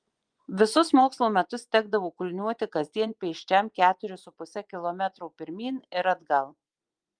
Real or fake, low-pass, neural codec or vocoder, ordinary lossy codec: fake; 9.9 kHz; vocoder, 24 kHz, 100 mel bands, Vocos; Opus, 32 kbps